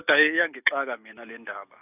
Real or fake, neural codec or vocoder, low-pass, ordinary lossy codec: real; none; 3.6 kHz; none